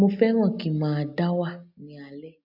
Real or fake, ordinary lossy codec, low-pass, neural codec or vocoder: real; AAC, 48 kbps; 5.4 kHz; none